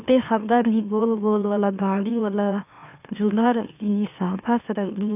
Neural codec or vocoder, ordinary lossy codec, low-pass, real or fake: autoencoder, 44.1 kHz, a latent of 192 numbers a frame, MeloTTS; none; 3.6 kHz; fake